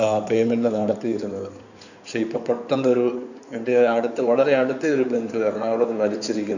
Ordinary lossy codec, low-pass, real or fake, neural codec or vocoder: none; 7.2 kHz; fake; codec, 16 kHz in and 24 kHz out, 2.2 kbps, FireRedTTS-2 codec